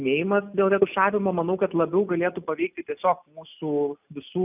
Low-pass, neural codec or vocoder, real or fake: 3.6 kHz; none; real